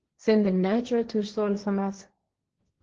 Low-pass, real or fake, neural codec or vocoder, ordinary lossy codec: 7.2 kHz; fake; codec, 16 kHz, 1.1 kbps, Voila-Tokenizer; Opus, 16 kbps